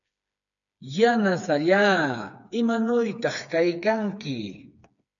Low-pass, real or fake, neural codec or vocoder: 7.2 kHz; fake; codec, 16 kHz, 4 kbps, FreqCodec, smaller model